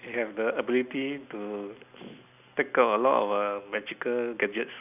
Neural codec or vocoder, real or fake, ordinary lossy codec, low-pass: none; real; none; 3.6 kHz